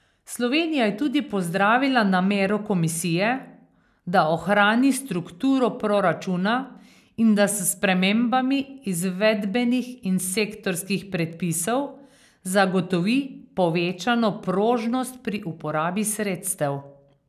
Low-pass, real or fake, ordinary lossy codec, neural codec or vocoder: 14.4 kHz; real; none; none